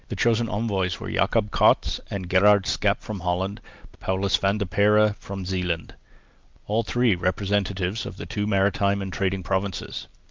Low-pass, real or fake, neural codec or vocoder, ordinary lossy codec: 7.2 kHz; real; none; Opus, 32 kbps